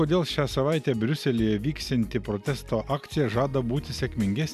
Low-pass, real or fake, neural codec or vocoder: 14.4 kHz; real; none